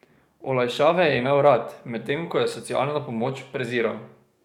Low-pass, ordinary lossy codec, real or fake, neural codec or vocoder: 19.8 kHz; none; fake; codec, 44.1 kHz, 7.8 kbps, DAC